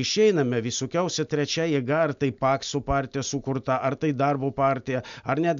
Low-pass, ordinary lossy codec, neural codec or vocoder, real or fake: 7.2 kHz; MP3, 64 kbps; none; real